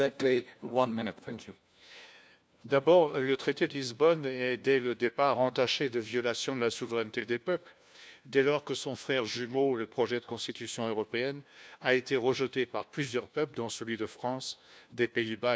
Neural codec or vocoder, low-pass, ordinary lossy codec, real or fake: codec, 16 kHz, 1 kbps, FunCodec, trained on LibriTTS, 50 frames a second; none; none; fake